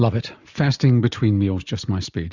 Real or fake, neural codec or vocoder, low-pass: real; none; 7.2 kHz